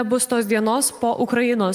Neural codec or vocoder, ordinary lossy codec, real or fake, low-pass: none; Opus, 32 kbps; real; 14.4 kHz